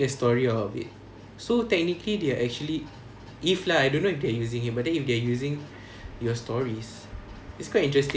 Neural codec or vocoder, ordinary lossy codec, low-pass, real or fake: none; none; none; real